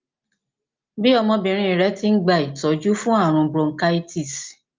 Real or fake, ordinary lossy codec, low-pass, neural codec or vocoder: real; Opus, 24 kbps; 7.2 kHz; none